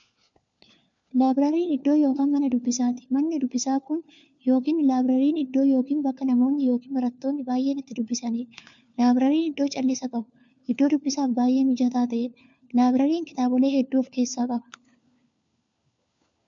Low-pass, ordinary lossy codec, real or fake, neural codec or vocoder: 7.2 kHz; MP3, 64 kbps; fake; codec, 16 kHz, 4 kbps, FunCodec, trained on LibriTTS, 50 frames a second